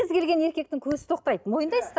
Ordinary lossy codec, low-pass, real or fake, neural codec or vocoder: none; none; real; none